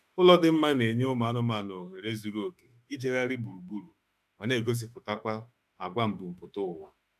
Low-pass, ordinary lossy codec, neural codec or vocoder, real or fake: 14.4 kHz; none; autoencoder, 48 kHz, 32 numbers a frame, DAC-VAE, trained on Japanese speech; fake